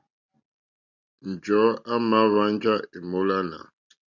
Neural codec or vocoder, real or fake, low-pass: none; real; 7.2 kHz